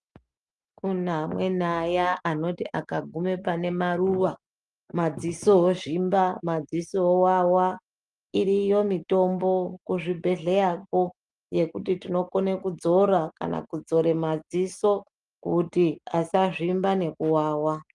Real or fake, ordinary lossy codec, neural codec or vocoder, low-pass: real; Opus, 32 kbps; none; 10.8 kHz